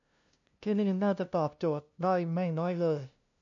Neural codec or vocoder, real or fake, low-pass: codec, 16 kHz, 0.5 kbps, FunCodec, trained on LibriTTS, 25 frames a second; fake; 7.2 kHz